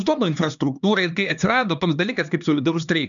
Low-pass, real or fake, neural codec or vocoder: 7.2 kHz; fake; codec, 16 kHz, 2 kbps, X-Codec, HuBERT features, trained on LibriSpeech